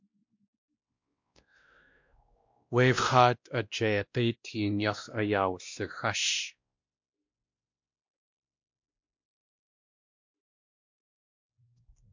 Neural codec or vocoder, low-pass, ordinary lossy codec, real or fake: codec, 16 kHz, 1 kbps, X-Codec, WavLM features, trained on Multilingual LibriSpeech; 7.2 kHz; MP3, 64 kbps; fake